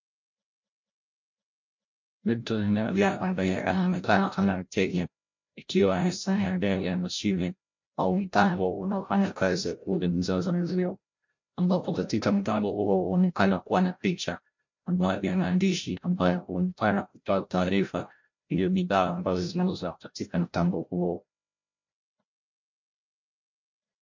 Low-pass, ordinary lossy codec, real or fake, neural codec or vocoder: 7.2 kHz; MP3, 48 kbps; fake; codec, 16 kHz, 0.5 kbps, FreqCodec, larger model